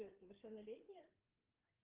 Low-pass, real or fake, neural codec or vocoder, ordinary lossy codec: 3.6 kHz; fake; codec, 16 kHz, 4 kbps, FreqCodec, larger model; Opus, 16 kbps